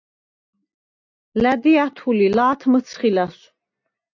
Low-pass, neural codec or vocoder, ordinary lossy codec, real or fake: 7.2 kHz; none; AAC, 48 kbps; real